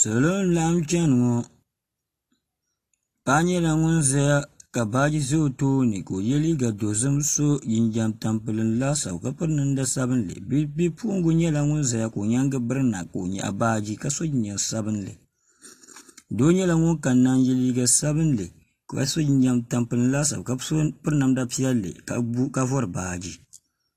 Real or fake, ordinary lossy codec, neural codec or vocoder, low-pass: real; AAC, 48 kbps; none; 14.4 kHz